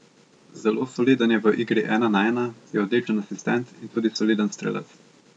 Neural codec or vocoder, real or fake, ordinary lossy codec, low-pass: none; real; none; 9.9 kHz